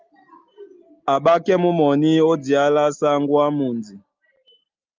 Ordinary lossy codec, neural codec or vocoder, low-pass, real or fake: Opus, 24 kbps; none; 7.2 kHz; real